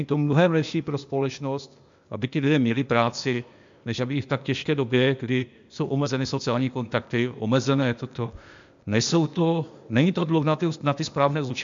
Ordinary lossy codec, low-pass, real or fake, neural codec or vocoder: MP3, 64 kbps; 7.2 kHz; fake; codec, 16 kHz, 0.8 kbps, ZipCodec